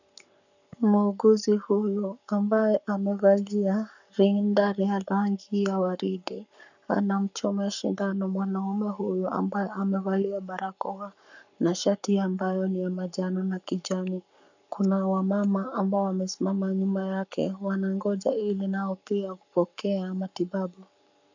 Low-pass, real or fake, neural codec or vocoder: 7.2 kHz; fake; codec, 44.1 kHz, 7.8 kbps, Pupu-Codec